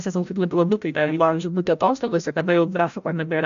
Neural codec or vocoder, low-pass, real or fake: codec, 16 kHz, 0.5 kbps, FreqCodec, larger model; 7.2 kHz; fake